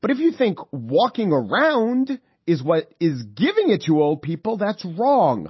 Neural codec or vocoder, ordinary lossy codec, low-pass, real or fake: none; MP3, 24 kbps; 7.2 kHz; real